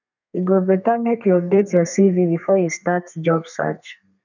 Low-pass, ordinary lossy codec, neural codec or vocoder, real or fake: 7.2 kHz; none; codec, 32 kHz, 1.9 kbps, SNAC; fake